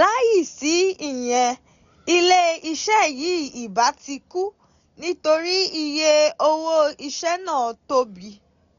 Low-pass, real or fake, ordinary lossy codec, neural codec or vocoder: 7.2 kHz; real; none; none